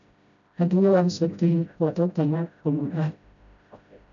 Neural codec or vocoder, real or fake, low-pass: codec, 16 kHz, 0.5 kbps, FreqCodec, smaller model; fake; 7.2 kHz